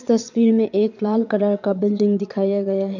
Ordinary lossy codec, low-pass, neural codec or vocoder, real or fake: none; 7.2 kHz; vocoder, 22.05 kHz, 80 mel bands, Vocos; fake